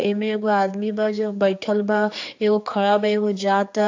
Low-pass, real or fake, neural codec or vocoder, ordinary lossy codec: 7.2 kHz; fake; codec, 16 kHz, 4 kbps, X-Codec, HuBERT features, trained on general audio; AAC, 48 kbps